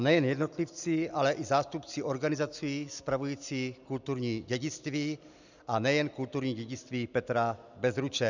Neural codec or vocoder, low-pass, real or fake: none; 7.2 kHz; real